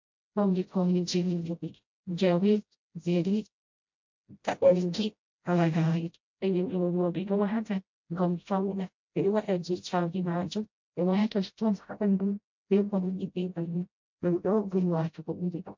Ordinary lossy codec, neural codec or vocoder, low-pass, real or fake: MP3, 48 kbps; codec, 16 kHz, 0.5 kbps, FreqCodec, smaller model; 7.2 kHz; fake